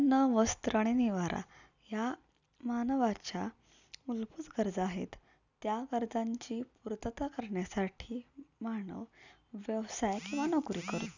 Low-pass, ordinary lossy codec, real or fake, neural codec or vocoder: 7.2 kHz; none; real; none